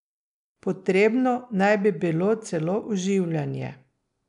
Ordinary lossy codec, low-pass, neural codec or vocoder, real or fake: none; 10.8 kHz; none; real